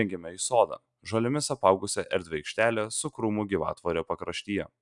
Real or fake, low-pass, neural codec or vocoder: real; 10.8 kHz; none